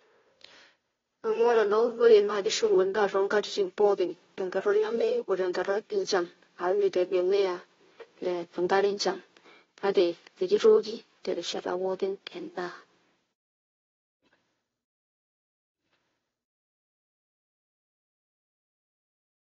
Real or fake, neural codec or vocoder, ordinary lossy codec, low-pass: fake; codec, 16 kHz, 0.5 kbps, FunCodec, trained on Chinese and English, 25 frames a second; AAC, 24 kbps; 7.2 kHz